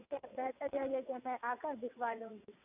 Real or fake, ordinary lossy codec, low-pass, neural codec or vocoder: fake; none; 3.6 kHz; vocoder, 22.05 kHz, 80 mel bands, WaveNeXt